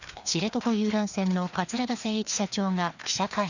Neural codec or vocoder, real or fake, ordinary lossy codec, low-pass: codec, 16 kHz, 2 kbps, FreqCodec, larger model; fake; none; 7.2 kHz